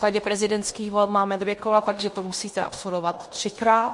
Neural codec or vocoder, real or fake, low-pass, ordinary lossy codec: codec, 16 kHz in and 24 kHz out, 0.9 kbps, LongCat-Audio-Codec, fine tuned four codebook decoder; fake; 10.8 kHz; MP3, 48 kbps